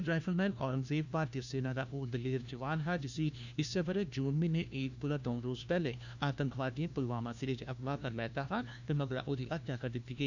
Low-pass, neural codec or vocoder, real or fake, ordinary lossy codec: 7.2 kHz; codec, 16 kHz, 1 kbps, FunCodec, trained on LibriTTS, 50 frames a second; fake; none